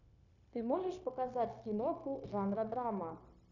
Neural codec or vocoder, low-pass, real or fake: codec, 16 kHz, 0.9 kbps, LongCat-Audio-Codec; 7.2 kHz; fake